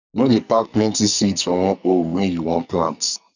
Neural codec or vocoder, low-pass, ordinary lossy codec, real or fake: codec, 44.1 kHz, 2.6 kbps, SNAC; 7.2 kHz; none; fake